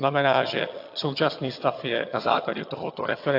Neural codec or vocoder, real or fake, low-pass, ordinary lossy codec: vocoder, 22.05 kHz, 80 mel bands, HiFi-GAN; fake; 5.4 kHz; AAC, 32 kbps